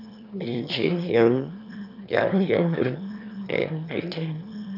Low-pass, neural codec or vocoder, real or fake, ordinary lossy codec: 5.4 kHz; autoencoder, 22.05 kHz, a latent of 192 numbers a frame, VITS, trained on one speaker; fake; none